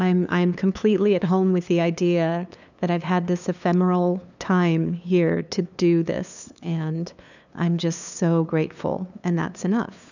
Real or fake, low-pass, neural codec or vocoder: fake; 7.2 kHz; codec, 16 kHz, 2 kbps, FunCodec, trained on LibriTTS, 25 frames a second